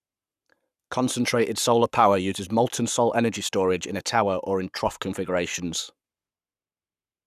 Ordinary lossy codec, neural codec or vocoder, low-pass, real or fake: none; codec, 44.1 kHz, 7.8 kbps, Pupu-Codec; 14.4 kHz; fake